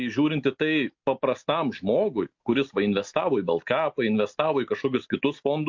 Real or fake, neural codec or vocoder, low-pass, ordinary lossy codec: real; none; 7.2 kHz; MP3, 48 kbps